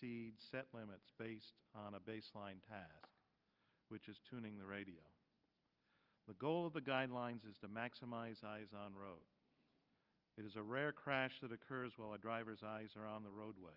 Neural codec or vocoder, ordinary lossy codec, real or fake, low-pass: none; Opus, 24 kbps; real; 5.4 kHz